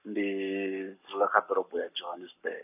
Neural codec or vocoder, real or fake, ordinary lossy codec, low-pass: codec, 44.1 kHz, 7.8 kbps, Pupu-Codec; fake; none; 3.6 kHz